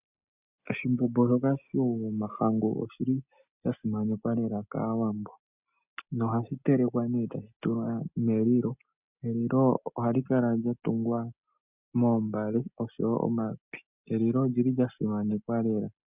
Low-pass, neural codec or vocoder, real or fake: 3.6 kHz; none; real